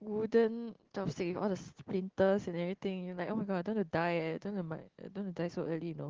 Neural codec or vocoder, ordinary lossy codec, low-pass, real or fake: none; Opus, 16 kbps; 7.2 kHz; real